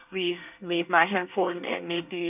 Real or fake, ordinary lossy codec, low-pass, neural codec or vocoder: fake; none; 3.6 kHz; codec, 24 kHz, 1 kbps, SNAC